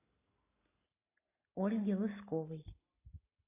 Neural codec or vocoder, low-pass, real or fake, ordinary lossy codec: none; 3.6 kHz; real; AAC, 24 kbps